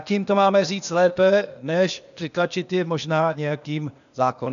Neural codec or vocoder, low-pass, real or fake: codec, 16 kHz, 0.8 kbps, ZipCodec; 7.2 kHz; fake